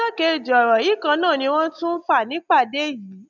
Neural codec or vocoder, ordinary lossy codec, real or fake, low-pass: none; none; real; 7.2 kHz